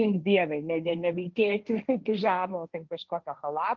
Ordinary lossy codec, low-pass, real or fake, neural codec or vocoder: Opus, 32 kbps; 7.2 kHz; fake; codec, 16 kHz, 1.1 kbps, Voila-Tokenizer